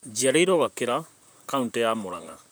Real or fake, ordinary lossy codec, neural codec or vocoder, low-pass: fake; none; vocoder, 44.1 kHz, 128 mel bands, Pupu-Vocoder; none